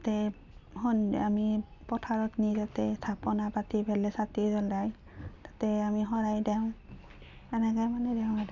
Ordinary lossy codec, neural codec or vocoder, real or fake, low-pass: none; none; real; 7.2 kHz